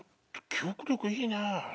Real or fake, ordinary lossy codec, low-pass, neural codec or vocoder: real; none; none; none